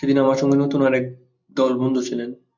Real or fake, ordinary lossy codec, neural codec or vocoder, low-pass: real; MP3, 64 kbps; none; 7.2 kHz